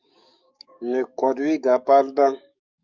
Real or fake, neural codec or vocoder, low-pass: fake; codec, 44.1 kHz, 7.8 kbps, DAC; 7.2 kHz